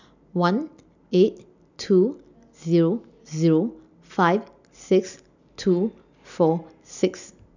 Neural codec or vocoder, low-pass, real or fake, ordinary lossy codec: none; 7.2 kHz; real; none